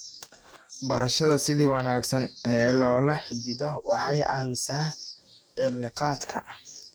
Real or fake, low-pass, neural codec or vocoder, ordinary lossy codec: fake; none; codec, 44.1 kHz, 2.6 kbps, DAC; none